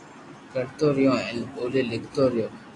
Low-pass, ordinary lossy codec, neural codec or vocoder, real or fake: 10.8 kHz; AAC, 48 kbps; none; real